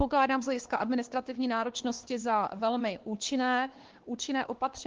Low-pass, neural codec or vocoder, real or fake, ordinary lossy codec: 7.2 kHz; codec, 16 kHz, 2 kbps, X-Codec, WavLM features, trained on Multilingual LibriSpeech; fake; Opus, 16 kbps